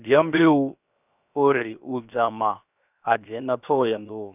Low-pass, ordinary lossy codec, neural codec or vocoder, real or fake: 3.6 kHz; none; codec, 16 kHz, 0.7 kbps, FocalCodec; fake